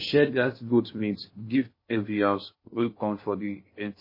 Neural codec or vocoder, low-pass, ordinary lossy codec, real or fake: codec, 16 kHz in and 24 kHz out, 0.6 kbps, FocalCodec, streaming, 2048 codes; 5.4 kHz; MP3, 24 kbps; fake